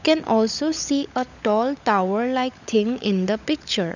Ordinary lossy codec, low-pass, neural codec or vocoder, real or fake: none; 7.2 kHz; codec, 16 kHz, 16 kbps, FunCodec, trained on LibriTTS, 50 frames a second; fake